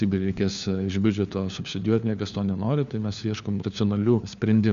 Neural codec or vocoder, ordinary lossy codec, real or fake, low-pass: codec, 16 kHz, 4 kbps, FunCodec, trained on LibriTTS, 50 frames a second; MP3, 96 kbps; fake; 7.2 kHz